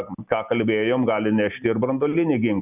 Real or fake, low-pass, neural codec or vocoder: real; 3.6 kHz; none